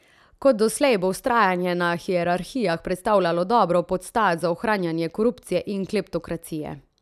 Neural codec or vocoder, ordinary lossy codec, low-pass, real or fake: none; none; 14.4 kHz; real